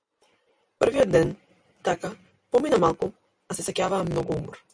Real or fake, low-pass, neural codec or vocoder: real; 9.9 kHz; none